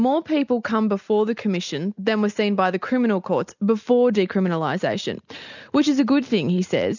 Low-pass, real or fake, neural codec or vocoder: 7.2 kHz; real; none